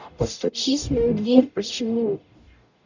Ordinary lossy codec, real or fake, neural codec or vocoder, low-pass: none; fake; codec, 44.1 kHz, 0.9 kbps, DAC; 7.2 kHz